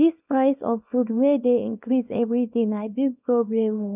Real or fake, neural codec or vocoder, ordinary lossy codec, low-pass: fake; codec, 24 kHz, 0.9 kbps, WavTokenizer, small release; none; 3.6 kHz